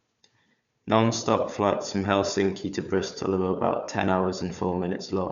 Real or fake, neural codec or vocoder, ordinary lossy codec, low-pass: fake; codec, 16 kHz, 4 kbps, FunCodec, trained on Chinese and English, 50 frames a second; none; 7.2 kHz